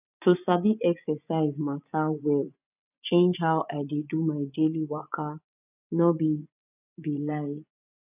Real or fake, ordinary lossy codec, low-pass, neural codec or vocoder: fake; none; 3.6 kHz; vocoder, 24 kHz, 100 mel bands, Vocos